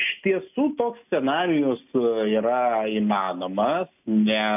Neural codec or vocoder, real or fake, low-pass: none; real; 3.6 kHz